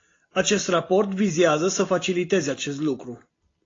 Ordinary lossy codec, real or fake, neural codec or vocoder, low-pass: AAC, 32 kbps; real; none; 7.2 kHz